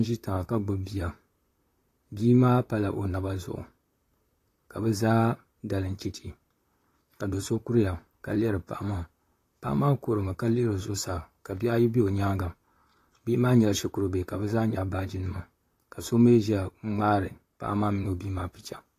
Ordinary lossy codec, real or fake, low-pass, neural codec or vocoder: AAC, 48 kbps; fake; 14.4 kHz; vocoder, 44.1 kHz, 128 mel bands, Pupu-Vocoder